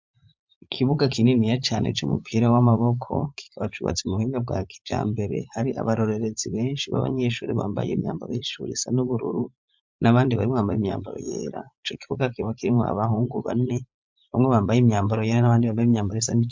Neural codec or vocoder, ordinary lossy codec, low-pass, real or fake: vocoder, 44.1 kHz, 80 mel bands, Vocos; MP3, 64 kbps; 7.2 kHz; fake